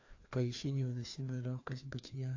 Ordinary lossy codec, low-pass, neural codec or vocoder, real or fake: AAC, 48 kbps; 7.2 kHz; codec, 16 kHz, 2 kbps, FreqCodec, larger model; fake